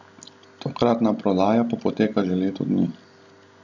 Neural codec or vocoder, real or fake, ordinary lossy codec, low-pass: none; real; none; 7.2 kHz